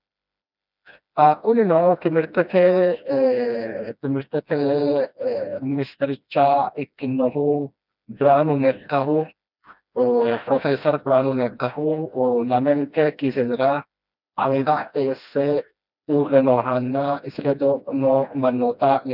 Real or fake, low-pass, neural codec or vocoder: fake; 5.4 kHz; codec, 16 kHz, 1 kbps, FreqCodec, smaller model